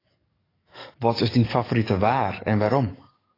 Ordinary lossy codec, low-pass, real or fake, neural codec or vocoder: AAC, 24 kbps; 5.4 kHz; fake; codec, 44.1 kHz, 7.8 kbps, DAC